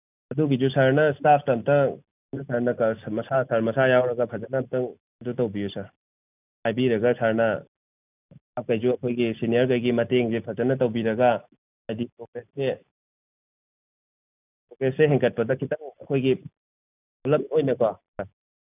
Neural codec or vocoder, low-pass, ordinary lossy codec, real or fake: none; 3.6 kHz; none; real